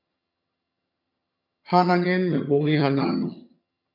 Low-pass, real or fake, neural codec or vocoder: 5.4 kHz; fake; vocoder, 22.05 kHz, 80 mel bands, HiFi-GAN